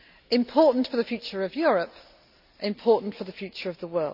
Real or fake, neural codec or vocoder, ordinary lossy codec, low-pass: real; none; none; 5.4 kHz